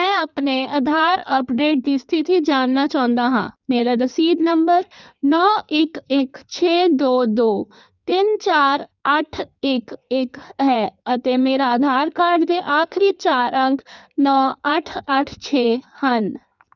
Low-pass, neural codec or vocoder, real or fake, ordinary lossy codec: 7.2 kHz; codec, 16 kHz in and 24 kHz out, 1.1 kbps, FireRedTTS-2 codec; fake; none